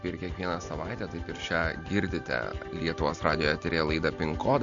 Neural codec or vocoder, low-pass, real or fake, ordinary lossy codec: none; 7.2 kHz; real; MP3, 48 kbps